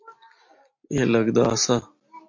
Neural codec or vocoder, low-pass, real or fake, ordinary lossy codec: none; 7.2 kHz; real; MP3, 48 kbps